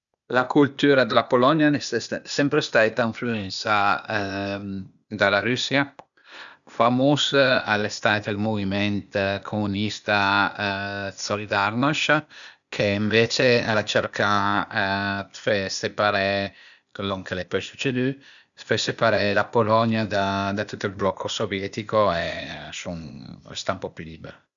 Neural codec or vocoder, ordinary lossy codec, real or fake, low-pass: codec, 16 kHz, 0.8 kbps, ZipCodec; none; fake; 7.2 kHz